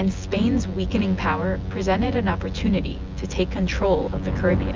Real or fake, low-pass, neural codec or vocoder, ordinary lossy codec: fake; 7.2 kHz; vocoder, 24 kHz, 100 mel bands, Vocos; Opus, 32 kbps